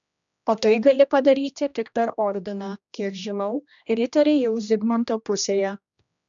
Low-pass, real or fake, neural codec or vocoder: 7.2 kHz; fake; codec, 16 kHz, 1 kbps, X-Codec, HuBERT features, trained on general audio